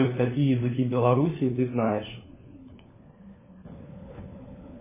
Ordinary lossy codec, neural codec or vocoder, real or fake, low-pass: MP3, 16 kbps; codec, 16 kHz, 4 kbps, FunCodec, trained on LibriTTS, 50 frames a second; fake; 3.6 kHz